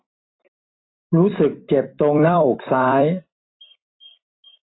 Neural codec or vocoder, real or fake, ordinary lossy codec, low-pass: vocoder, 44.1 kHz, 128 mel bands every 256 samples, BigVGAN v2; fake; AAC, 16 kbps; 7.2 kHz